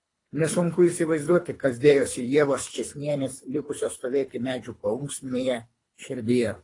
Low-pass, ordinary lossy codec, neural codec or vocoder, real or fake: 10.8 kHz; AAC, 32 kbps; codec, 24 kHz, 3 kbps, HILCodec; fake